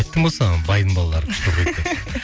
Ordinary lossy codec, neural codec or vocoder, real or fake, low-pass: none; none; real; none